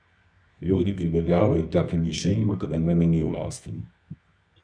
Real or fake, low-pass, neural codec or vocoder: fake; 9.9 kHz; codec, 24 kHz, 0.9 kbps, WavTokenizer, medium music audio release